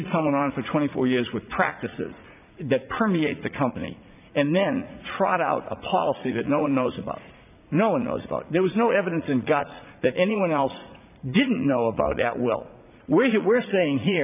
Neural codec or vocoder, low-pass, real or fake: vocoder, 44.1 kHz, 80 mel bands, Vocos; 3.6 kHz; fake